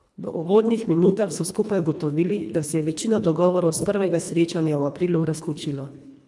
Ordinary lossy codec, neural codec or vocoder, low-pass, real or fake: none; codec, 24 kHz, 1.5 kbps, HILCodec; none; fake